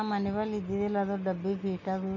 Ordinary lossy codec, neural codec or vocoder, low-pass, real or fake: none; none; 7.2 kHz; real